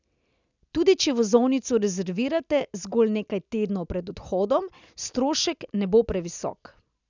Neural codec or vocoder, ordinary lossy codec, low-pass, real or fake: none; none; 7.2 kHz; real